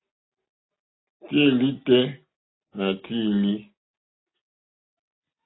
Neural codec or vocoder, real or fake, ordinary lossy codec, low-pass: none; real; AAC, 16 kbps; 7.2 kHz